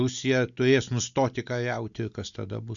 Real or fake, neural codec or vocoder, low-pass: real; none; 7.2 kHz